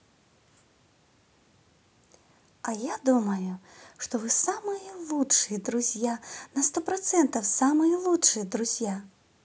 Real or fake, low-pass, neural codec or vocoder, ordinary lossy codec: real; none; none; none